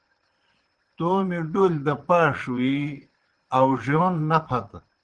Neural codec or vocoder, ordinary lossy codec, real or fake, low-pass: vocoder, 22.05 kHz, 80 mel bands, Vocos; Opus, 16 kbps; fake; 9.9 kHz